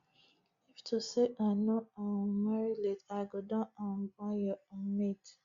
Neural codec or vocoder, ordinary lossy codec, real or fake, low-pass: none; Opus, 64 kbps; real; 7.2 kHz